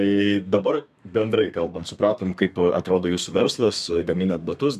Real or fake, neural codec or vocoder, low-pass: fake; codec, 32 kHz, 1.9 kbps, SNAC; 14.4 kHz